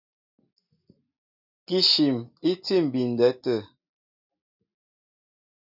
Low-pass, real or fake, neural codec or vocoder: 5.4 kHz; real; none